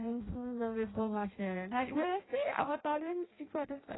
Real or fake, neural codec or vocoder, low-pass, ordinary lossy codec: fake; codec, 16 kHz in and 24 kHz out, 0.6 kbps, FireRedTTS-2 codec; 7.2 kHz; AAC, 16 kbps